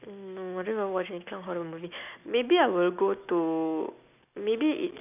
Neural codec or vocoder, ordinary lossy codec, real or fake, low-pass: none; none; real; 3.6 kHz